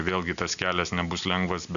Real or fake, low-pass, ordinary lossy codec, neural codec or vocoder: real; 7.2 kHz; MP3, 96 kbps; none